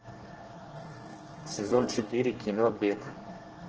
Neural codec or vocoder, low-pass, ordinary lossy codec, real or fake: codec, 24 kHz, 1 kbps, SNAC; 7.2 kHz; Opus, 16 kbps; fake